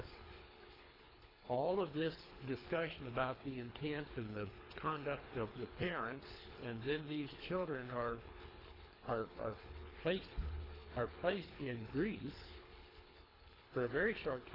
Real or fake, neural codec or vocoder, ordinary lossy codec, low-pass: fake; codec, 24 kHz, 3 kbps, HILCodec; AAC, 24 kbps; 5.4 kHz